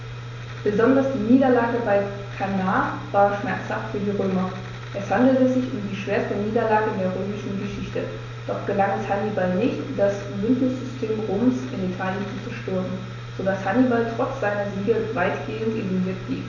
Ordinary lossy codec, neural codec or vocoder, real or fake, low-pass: none; none; real; 7.2 kHz